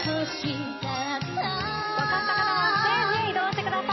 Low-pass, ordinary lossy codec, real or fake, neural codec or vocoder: 7.2 kHz; MP3, 24 kbps; fake; vocoder, 44.1 kHz, 128 mel bands every 256 samples, BigVGAN v2